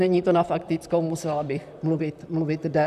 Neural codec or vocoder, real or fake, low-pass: vocoder, 44.1 kHz, 128 mel bands, Pupu-Vocoder; fake; 14.4 kHz